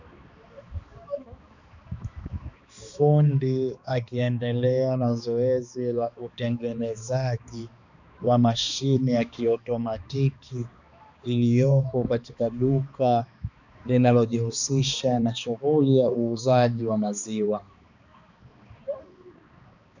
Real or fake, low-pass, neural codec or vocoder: fake; 7.2 kHz; codec, 16 kHz, 2 kbps, X-Codec, HuBERT features, trained on balanced general audio